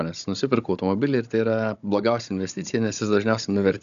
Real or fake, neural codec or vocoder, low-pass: fake; codec, 16 kHz, 16 kbps, FunCodec, trained on Chinese and English, 50 frames a second; 7.2 kHz